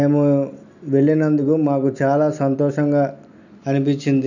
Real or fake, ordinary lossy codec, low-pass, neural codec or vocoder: real; none; 7.2 kHz; none